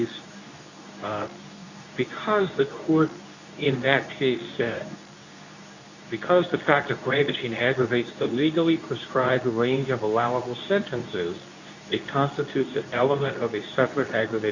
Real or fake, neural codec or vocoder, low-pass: fake; codec, 24 kHz, 0.9 kbps, WavTokenizer, medium speech release version 2; 7.2 kHz